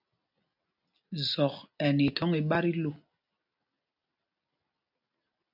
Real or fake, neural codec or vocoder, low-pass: real; none; 5.4 kHz